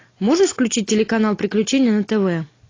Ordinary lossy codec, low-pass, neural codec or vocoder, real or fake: AAC, 32 kbps; 7.2 kHz; none; real